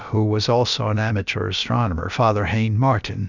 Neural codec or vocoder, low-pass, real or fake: codec, 16 kHz, about 1 kbps, DyCAST, with the encoder's durations; 7.2 kHz; fake